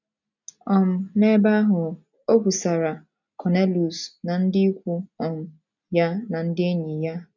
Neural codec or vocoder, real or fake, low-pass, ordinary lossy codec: none; real; 7.2 kHz; none